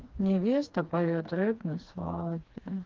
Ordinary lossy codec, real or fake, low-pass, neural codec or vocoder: Opus, 32 kbps; fake; 7.2 kHz; codec, 16 kHz, 2 kbps, FreqCodec, smaller model